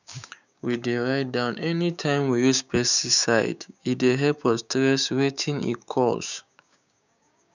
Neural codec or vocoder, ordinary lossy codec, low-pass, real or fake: codec, 16 kHz, 6 kbps, DAC; none; 7.2 kHz; fake